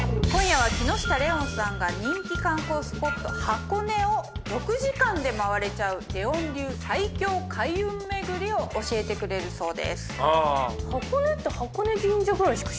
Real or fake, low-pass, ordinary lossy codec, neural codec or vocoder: real; none; none; none